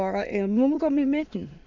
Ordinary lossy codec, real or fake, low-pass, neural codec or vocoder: none; fake; 7.2 kHz; autoencoder, 22.05 kHz, a latent of 192 numbers a frame, VITS, trained on many speakers